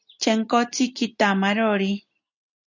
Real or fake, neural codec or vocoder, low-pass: real; none; 7.2 kHz